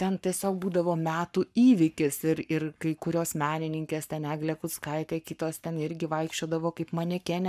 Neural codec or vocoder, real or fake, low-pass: codec, 44.1 kHz, 7.8 kbps, Pupu-Codec; fake; 14.4 kHz